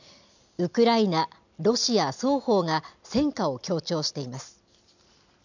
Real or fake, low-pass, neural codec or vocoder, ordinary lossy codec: real; 7.2 kHz; none; none